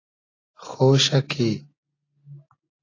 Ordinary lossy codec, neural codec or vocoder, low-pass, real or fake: AAC, 32 kbps; none; 7.2 kHz; real